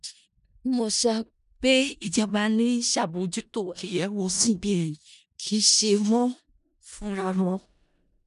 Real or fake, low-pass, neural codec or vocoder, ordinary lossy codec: fake; 10.8 kHz; codec, 16 kHz in and 24 kHz out, 0.4 kbps, LongCat-Audio-Codec, four codebook decoder; none